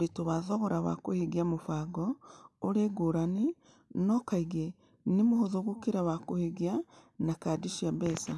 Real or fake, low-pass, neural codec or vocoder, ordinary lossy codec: real; none; none; none